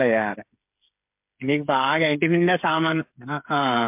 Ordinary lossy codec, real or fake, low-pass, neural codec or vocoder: MP3, 32 kbps; fake; 3.6 kHz; codec, 16 kHz, 8 kbps, FreqCodec, smaller model